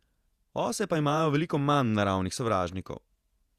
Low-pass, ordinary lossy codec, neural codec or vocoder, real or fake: 14.4 kHz; Opus, 64 kbps; vocoder, 48 kHz, 128 mel bands, Vocos; fake